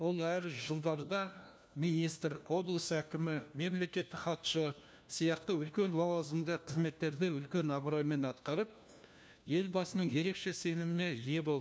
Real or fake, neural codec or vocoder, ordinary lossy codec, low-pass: fake; codec, 16 kHz, 1 kbps, FunCodec, trained on LibriTTS, 50 frames a second; none; none